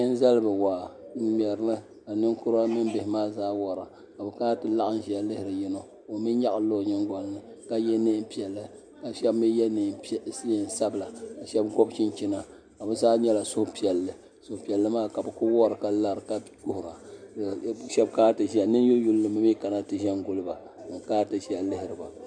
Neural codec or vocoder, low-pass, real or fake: none; 9.9 kHz; real